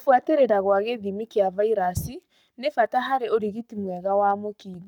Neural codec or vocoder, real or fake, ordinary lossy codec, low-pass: codec, 44.1 kHz, 7.8 kbps, Pupu-Codec; fake; none; 19.8 kHz